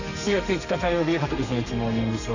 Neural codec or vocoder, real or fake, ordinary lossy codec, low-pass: codec, 32 kHz, 1.9 kbps, SNAC; fake; none; 7.2 kHz